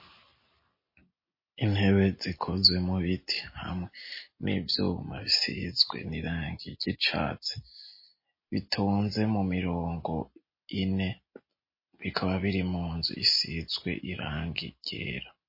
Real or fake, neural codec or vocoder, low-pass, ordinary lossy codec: real; none; 5.4 kHz; MP3, 24 kbps